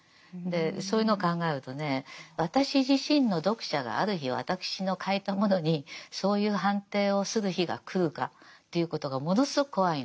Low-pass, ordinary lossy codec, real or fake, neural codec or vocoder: none; none; real; none